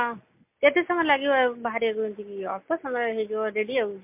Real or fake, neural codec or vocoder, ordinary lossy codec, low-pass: real; none; MP3, 32 kbps; 3.6 kHz